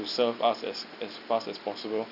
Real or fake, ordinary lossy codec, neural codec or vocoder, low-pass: real; none; none; 5.4 kHz